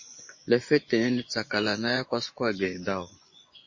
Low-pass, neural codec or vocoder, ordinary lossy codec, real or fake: 7.2 kHz; vocoder, 24 kHz, 100 mel bands, Vocos; MP3, 32 kbps; fake